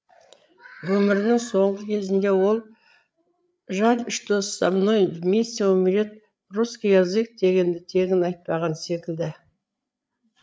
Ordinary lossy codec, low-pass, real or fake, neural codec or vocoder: none; none; fake; codec, 16 kHz, 8 kbps, FreqCodec, larger model